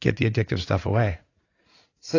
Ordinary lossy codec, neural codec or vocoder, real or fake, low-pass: AAC, 32 kbps; vocoder, 44.1 kHz, 80 mel bands, Vocos; fake; 7.2 kHz